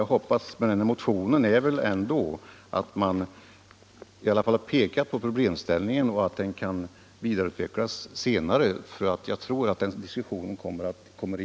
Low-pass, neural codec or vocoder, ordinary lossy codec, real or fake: none; none; none; real